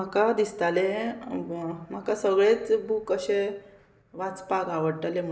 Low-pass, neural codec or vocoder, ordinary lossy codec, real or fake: none; none; none; real